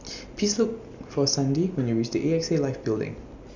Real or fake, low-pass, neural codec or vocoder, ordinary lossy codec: real; 7.2 kHz; none; none